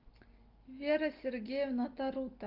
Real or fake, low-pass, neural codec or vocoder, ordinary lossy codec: real; 5.4 kHz; none; Opus, 32 kbps